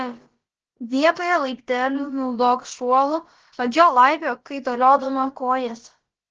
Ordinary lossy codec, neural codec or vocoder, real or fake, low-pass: Opus, 16 kbps; codec, 16 kHz, about 1 kbps, DyCAST, with the encoder's durations; fake; 7.2 kHz